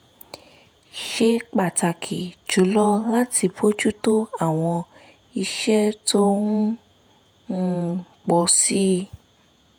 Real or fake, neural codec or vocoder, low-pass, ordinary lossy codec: fake; vocoder, 48 kHz, 128 mel bands, Vocos; none; none